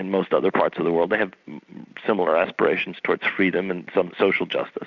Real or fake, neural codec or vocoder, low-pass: real; none; 7.2 kHz